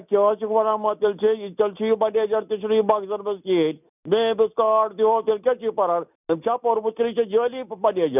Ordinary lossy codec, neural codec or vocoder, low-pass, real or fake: none; none; 3.6 kHz; real